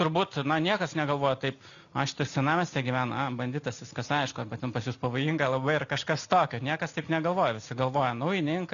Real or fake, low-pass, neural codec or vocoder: real; 7.2 kHz; none